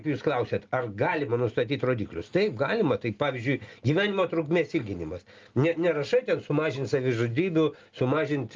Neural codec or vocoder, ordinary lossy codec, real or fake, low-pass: none; Opus, 32 kbps; real; 7.2 kHz